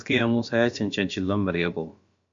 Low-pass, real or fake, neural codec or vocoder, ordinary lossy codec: 7.2 kHz; fake; codec, 16 kHz, about 1 kbps, DyCAST, with the encoder's durations; MP3, 48 kbps